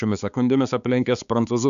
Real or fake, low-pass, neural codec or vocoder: fake; 7.2 kHz; codec, 16 kHz, 4 kbps, X-Codec, HuBERT features, trained on balanced general audio